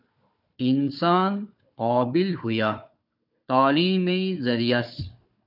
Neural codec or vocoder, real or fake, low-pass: codec, 16 kHz, 4 kbps, FunCodec, trained on Chinese and English, 50 frames a second; fake; 5.4 kHz